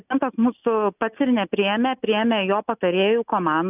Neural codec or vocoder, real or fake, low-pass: none; real; 3.6 kHz